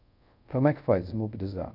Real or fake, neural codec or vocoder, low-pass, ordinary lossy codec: fake; codec, 24 kHz, 0.5 kbps, DualCodec; 5.4 kHz; none